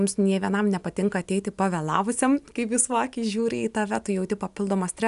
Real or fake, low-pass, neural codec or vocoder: real; 10.8 kHz; none